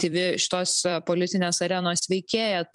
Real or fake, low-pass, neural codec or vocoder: fake; 10.8 kHz; vocoder, 44.1 kHz, 128 mel bands every 256 samples, BigVGAN v2